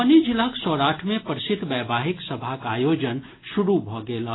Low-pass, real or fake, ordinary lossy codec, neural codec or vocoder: 7.2 kHz; real; AAC, 16 kbps; none